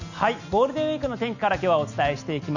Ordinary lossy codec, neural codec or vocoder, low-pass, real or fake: none; none; 7.2 kHz; real